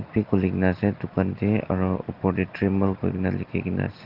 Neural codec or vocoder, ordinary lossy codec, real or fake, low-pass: none; Opus, 16 kbps; real; 5.4 kHz